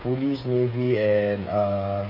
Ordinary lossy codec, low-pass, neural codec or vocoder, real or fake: MP3, 48 kbps; 5.4 kHz; codec, 16 kHz, 8 kbps, FreqCodec, smaller model; fake